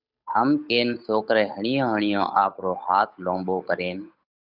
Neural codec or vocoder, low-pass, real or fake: codec, 16 kHz, 8 kbps, FunCodec, trained on Chinese and English, 25 frames a second; 5.4 kHz; fake